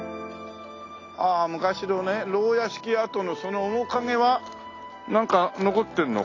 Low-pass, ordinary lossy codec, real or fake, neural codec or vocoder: 7.2 kHz; AAC, 32 kbps; real; none